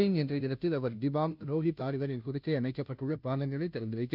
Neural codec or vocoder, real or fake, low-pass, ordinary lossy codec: codec, 16 kHz, 0.5 kbps, FunCodec, trained on Chinese and English, 25 frames a second; fake; 5.4 kHz; none